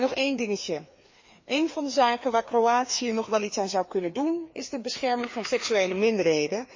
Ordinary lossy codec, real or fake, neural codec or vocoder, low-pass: MP3, 32 kbps; fake; codec, 16 kHz, 2 kbps, FreqCodec, larger model; 7.2 kHz